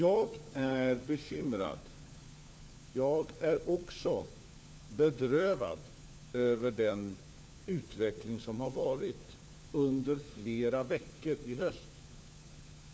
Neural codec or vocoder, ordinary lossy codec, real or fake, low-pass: codec, 16 kHz, 4 kbps, FunCodec, trained on LibriTTS, 50 frames a second; none; fake; none